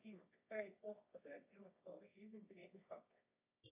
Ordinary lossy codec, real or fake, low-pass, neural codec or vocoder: AAC, 24 kbps; fake; 3.6 kHz; codec, 24 kHz, 0.9 kbps, WavTokenizer, medium music audio release